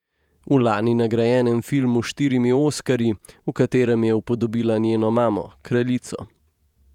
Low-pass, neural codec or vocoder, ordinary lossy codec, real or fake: 19.8 kHz; vocoder, 48 kHz, 128 mel bands, Vocos; none; fake